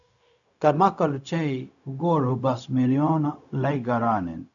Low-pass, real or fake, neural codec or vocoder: 7.2 kHz; fake; codec, 16 kHz, 0.4 kbps, LongCat-Audio-Codec